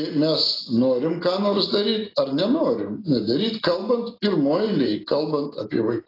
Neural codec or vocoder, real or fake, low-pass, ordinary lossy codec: none; real; 5.4 kHz; AAC, 24 kbps